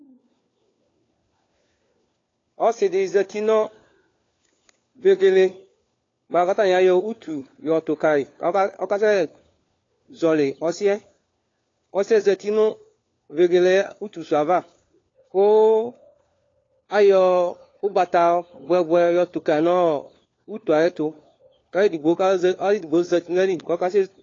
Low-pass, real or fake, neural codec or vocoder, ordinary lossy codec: 7.2 kHz; fake; codec, 16 kHz, 4 kbps, FunCodec, trained on LibriTTS, 50 frames a second; AAC, 32 kbps